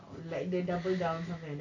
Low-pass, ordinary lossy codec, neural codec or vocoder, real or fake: 7.2 kHz; AAC, 48 kbps; none; real